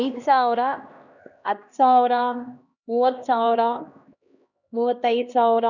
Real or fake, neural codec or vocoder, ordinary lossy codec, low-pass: fake; codec, 16 kHz, 1 kbps, X-Codec, HuBERT features, trained on LibriSpeech; none; 7.2 kHz